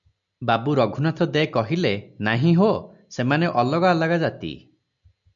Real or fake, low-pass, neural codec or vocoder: real; 7.2 kHz; none